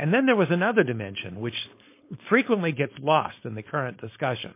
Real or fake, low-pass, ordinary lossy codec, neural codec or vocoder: fake; 3.6 kHz; MP3, 24 kbps; codec, 16 kHz, 4.8 kbps, FACodec